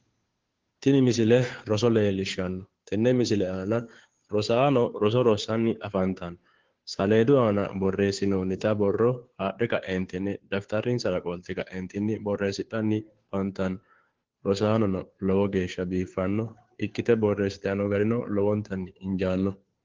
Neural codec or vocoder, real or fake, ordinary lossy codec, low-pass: codec, 16 kHz, 2 kbps, FunCodec, trained on Chinese and English, 25 frames a second; fake; Opus, 16 kbps; 7.2 kHz